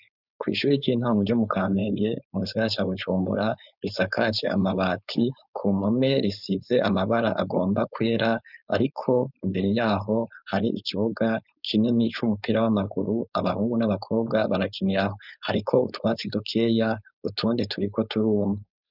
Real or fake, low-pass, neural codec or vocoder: fake; 5.4 kHz; codec, 16 kHz, 4.8 kbps, FACodec